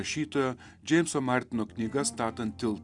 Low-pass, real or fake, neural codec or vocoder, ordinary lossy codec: 10.8 kHz; real; none; Opus, 64 kbps